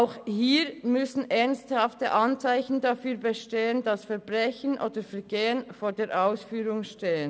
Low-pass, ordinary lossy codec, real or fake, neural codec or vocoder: none; none; real; none